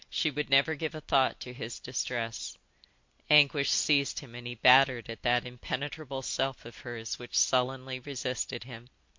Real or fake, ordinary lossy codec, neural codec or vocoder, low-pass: real; MP3, 48 kbps; none; 7.2 kHz